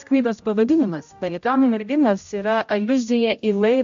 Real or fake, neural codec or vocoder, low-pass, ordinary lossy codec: fake; codec, 16 kHz, 0.5 kbps, X-Codec, HuBERT features, trained on general audio; 7.2 kHz; AAC, 64 kbps